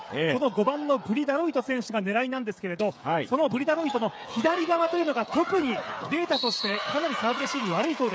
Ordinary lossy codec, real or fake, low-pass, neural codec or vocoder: none; fake; none; codec, 16 kHz, 8 kbps, FreqCodec, smaller model